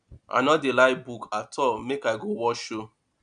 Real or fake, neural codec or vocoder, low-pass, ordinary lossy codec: real; none; 9.9 kHz; none